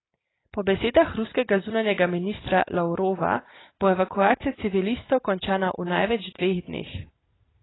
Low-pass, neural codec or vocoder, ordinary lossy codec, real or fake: 7.2 kHz; none; AAC, 16 kbps; real